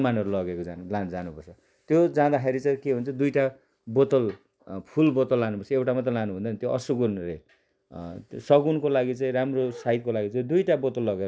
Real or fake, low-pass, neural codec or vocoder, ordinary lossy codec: real; none; none; none